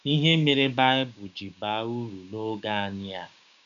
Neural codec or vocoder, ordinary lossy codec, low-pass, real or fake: codec, 16 kHz, 6 kbps, DAC; none; 7.2 kHz; fake